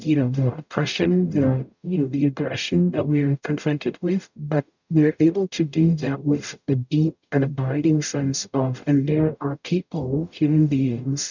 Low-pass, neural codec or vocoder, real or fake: 7.2 kHz; codec, 44.1 kHz, 0.9 kbps, DAC; fake